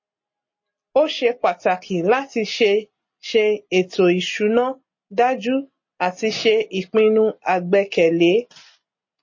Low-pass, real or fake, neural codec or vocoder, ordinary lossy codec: 7.2 kHz; real; none; MP3, 32 kbps